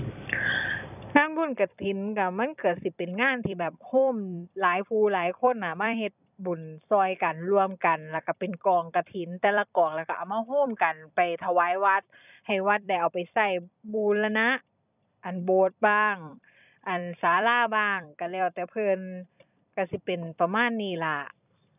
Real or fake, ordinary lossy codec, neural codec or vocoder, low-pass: fake; none; vocoder, 44.1 kHz, 128 mel bands every 256 samples, BigVGAN v2; 3.6 kHz